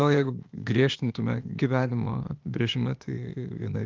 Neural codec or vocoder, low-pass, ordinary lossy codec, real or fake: codec, 16 kHz, 0.8 kbps, ZipCodec; 7.2 kHz; Opus, 32 kbps; fake